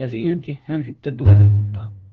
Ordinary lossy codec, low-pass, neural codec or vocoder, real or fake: Opus, 16 kbps; 7.2 kHz; codec, 16 kHz, 1 kbps, FunCodec, trained on LibriTTS, 50 frames a second; fake